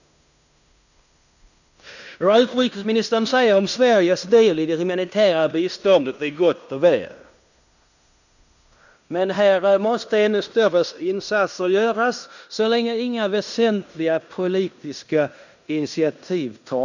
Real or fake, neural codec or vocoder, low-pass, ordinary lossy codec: fake; codec, 16 kHz in and 24 kHz out, 0.9 kbps, LongCat-Audio-Codec, fine tuned four codebook decoder; 7.2 kHz; none